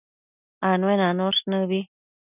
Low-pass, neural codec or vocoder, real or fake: 3.6 kHz; none; real